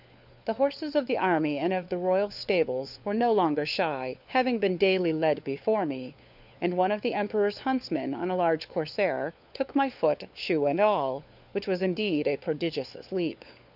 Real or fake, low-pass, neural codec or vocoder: fake; 5.4 kHz; codec, 16 kHz, 4 kbps, FunCodec, trained on LibriTTS, 50 frames a second